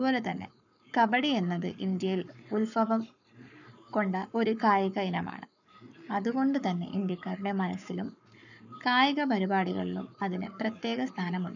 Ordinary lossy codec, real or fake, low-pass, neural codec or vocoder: none; fake; 7.2 kHz; codec, 44.1 kHz, 7.8 kbps, Pupu-Codec